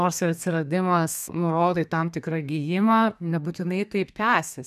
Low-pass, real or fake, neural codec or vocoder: 14.4 kHz; fake; codec, 32 kHz, 1.9 kbps, SNAC